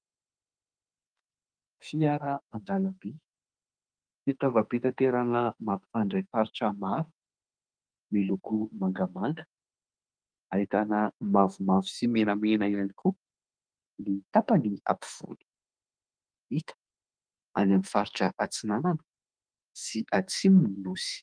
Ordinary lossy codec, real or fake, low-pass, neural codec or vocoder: Opus, 32 kbps; fake; 9.9 kHz; autoencoder, 48 kHz, 32 numbers a frame, DAC-VAE, trained on Japanese speech